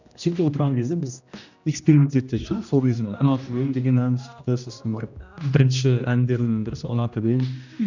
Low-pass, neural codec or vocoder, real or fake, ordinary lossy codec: 7.2 kHz; codec, 16 kHz, 1 kbps, X-Codec, HuBERT features, trained on general audio; fake; none